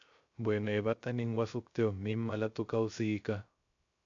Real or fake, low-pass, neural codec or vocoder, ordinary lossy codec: fake; 7.2 kHz; codec, 16 kHz, 0.3 kbps, FocalCodec; MP3, 64 kbps